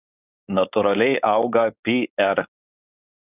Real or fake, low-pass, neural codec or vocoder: fake; 3.6 kHz; codec, 16 kHz, 4.8 kbps, FACodec